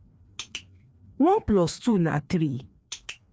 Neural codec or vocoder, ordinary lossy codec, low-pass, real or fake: codec, 16 kHz, 2 kbps, FreqCodec, larger model; none; none; fake